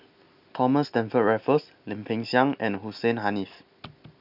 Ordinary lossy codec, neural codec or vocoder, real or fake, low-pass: none; none; real; 5.4 kHz